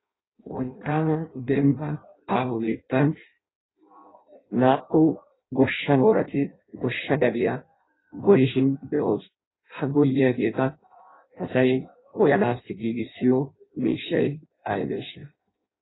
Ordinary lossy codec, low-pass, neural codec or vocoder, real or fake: AAC, 16 kbps; 7.2 kHz; codec, 16 kHz in and 24 kHz out, 0.6 kbps, FireRedTTS-2 codec; fake